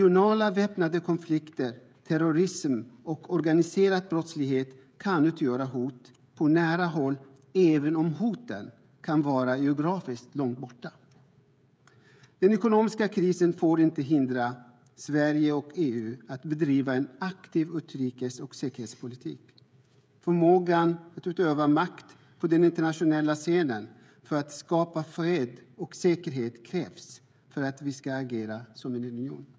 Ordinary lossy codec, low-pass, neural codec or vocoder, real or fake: none; none; codec, 16 kHz, 16 kbps, FreqCodec, smaller model; fake